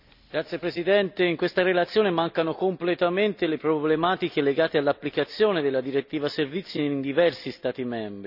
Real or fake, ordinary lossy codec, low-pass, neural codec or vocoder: real; none; 5.4 kHz; none